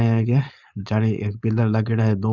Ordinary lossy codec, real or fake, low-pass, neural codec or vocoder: none; fake; 7.2 kHz; codec, 16 kHz, 4.8 kbps, FACodec